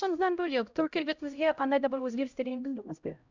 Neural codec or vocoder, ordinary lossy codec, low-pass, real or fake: codec, 16 kHz, 0.5 kbps, X-Codec, HuBERT features, trained on LibriSpeech; Opus, 64 kbps; 7.2 kHz; fake